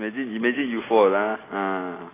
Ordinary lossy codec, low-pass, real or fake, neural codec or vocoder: AAC, 16 kbps; 3.6 kHz; real; none